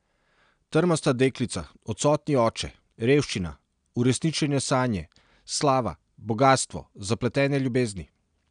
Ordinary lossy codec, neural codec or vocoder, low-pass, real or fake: none; none; 9.9 kHz; real